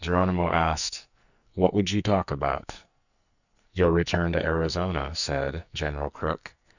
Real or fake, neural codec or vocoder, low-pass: fake; codec, 44.1 kHz, 2.6 kbps, SNAC; 7.2 kHz